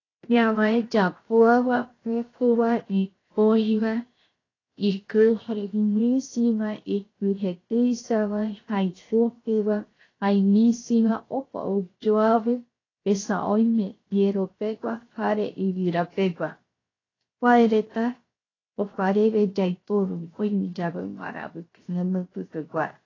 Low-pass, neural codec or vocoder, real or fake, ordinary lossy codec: 7.2 kHz; codec, 16 kHz, 0.7 kbps, FocalCodec; fake; AAC, 32 kbps